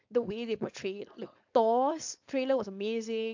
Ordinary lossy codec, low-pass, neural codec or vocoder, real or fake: AAC, 48 kbps; 7.2 kHz; codec, 16 kHz, 4.8 kbps, FACodec; fake